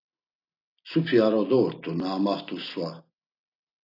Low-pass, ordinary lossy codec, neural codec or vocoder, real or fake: 5.4 kHz; AAC, 32 kbps; none; real